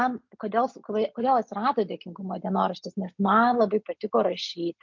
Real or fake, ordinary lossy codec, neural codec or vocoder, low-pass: real; MP3, 48 kbps; none; 7.2 kHz